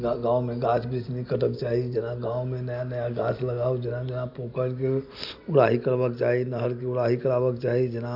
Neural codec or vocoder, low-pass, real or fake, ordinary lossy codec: none; 5.4 kHz; real; AAC, 48 kbps